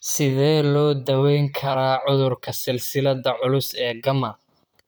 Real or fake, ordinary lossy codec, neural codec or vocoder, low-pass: fake; none; vocoder, 44.1 kHz, 128 mel bands, Pupu-Vocoder; none